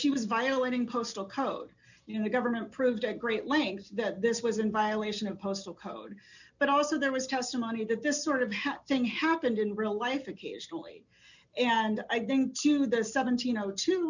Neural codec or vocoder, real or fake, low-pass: none; real; 7.2 kHz